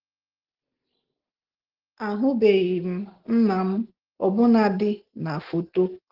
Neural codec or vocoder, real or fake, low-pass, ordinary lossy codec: none; real; 5.4 kHz; Opus, 16 kbps